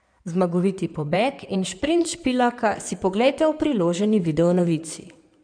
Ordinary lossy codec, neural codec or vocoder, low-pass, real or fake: none; codec, 16 kHz in and 24 kHz out, 2.2 kbps, FireRedTTS-2 codec; 9.9 kHz; fake